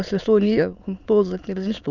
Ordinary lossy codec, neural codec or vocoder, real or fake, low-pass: none; autoencoder, 22.05 kHz, a latent of 192 numbers a frame, VITS, trained on many speakers; fake; 7.2 kHz